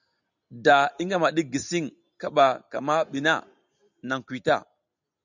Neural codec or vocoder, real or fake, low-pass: none; real; 7.2 kHz